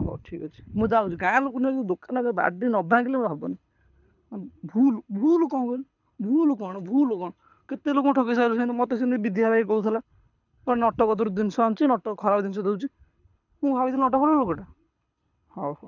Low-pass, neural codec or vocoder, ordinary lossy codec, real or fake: 7.2 kHz; codec, 24 kHz, 6 kbps, HILCodec; none; fake